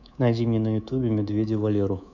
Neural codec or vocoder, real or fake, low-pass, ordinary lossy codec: codec, 24 kHz, 3.1 kbps, DualCodec; fake; 7.2 kHz; none